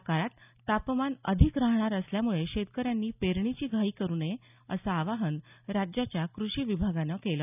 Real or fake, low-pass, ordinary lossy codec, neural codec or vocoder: real; 3.6 kHz; none; none